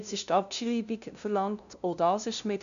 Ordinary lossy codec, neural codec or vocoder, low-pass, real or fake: none; codec, 16 kHz, 0.5 kbps, FunCodec, trained on LibriTTS, 25 frames a second; 7.2 kHz; fake